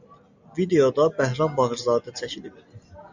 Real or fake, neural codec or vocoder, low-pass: real; none; 7.2 kHz